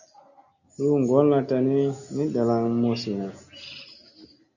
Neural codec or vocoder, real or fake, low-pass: none; real; 7.2 kHz